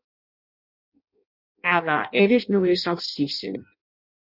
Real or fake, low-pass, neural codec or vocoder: fake; 5.4 kHz; codec, 16 kHz in and 24 kHz out, 0.6 kbps, FireRedTTS-2 codec